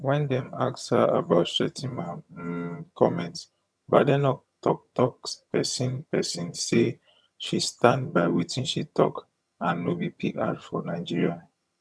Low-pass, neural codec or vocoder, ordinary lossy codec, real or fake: none; vocoder, 22.05 kHz, 80 mel bands, HiFi-GAN; none; fake